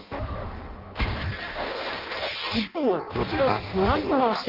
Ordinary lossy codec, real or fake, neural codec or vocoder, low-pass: Opus, 32 kbps; fake; codec, 16 kHz in and 24 kHz out, 0.6 kbps, FireRedTTS-2 codec; 5.4 kHz